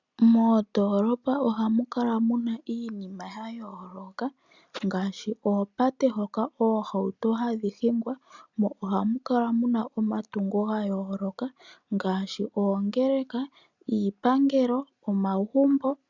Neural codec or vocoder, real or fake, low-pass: none; real; 7.2 kHz